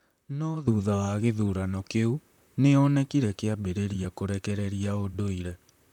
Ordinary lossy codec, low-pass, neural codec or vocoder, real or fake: none; 19.8 kHz; vocoder, 44.1 kHz, 128 mel bands, Pupu-Vocoder; fake